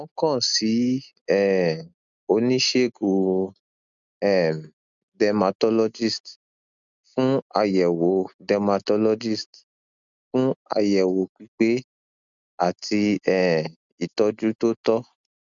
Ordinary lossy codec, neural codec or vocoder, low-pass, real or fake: none; none; 7.2 kHz; real